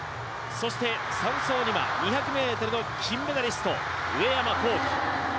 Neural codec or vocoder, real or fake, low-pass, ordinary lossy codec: none; real; none; none